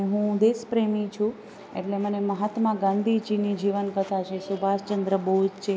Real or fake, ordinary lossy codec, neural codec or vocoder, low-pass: real; none; none; none